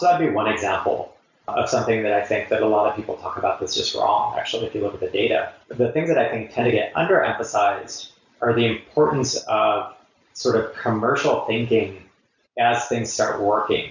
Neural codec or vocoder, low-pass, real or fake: none; 7.2 kHz; real